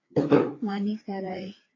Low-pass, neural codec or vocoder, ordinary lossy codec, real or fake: 7.2 kHz; codec, 16 kHz, 4 kbps, FreqCodec, larger model; AAC, 32 kbps; fake